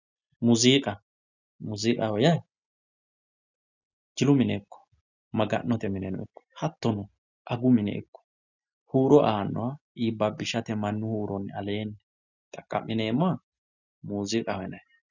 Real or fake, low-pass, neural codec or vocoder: real; 7.2 kHz; none